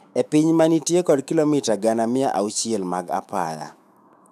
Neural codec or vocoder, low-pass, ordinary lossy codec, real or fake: autoencoder, 48 kHz, 128 numbers a frame, DAC-VAE, trained on Japanese speech; 14.4 kHz; none; fake